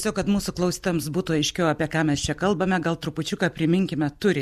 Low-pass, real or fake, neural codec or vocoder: 14.4 kHz; real; none